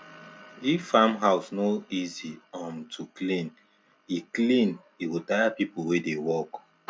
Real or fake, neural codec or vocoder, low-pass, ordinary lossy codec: real; none; none; none